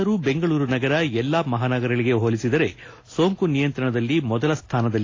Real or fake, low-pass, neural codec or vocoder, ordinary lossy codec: real; 7.2 kHz; none; AAC, 32 kbps